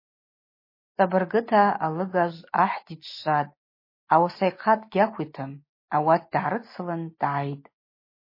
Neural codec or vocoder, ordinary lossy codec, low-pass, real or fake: none; MP3, 24 kbps; 5.4 kHz; real